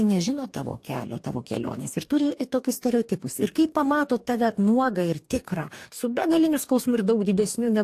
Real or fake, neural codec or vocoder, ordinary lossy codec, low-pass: fake; codec, 44.1 kHz, 2.6 kbps, DAC; AAC, 64 kbps; 14.4 kHz